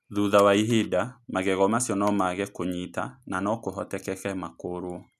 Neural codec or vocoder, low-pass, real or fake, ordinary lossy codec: none; 14.4 kHz; real; none